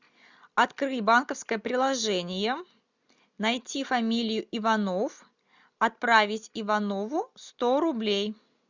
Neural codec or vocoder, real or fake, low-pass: none; real; 7.2 kHz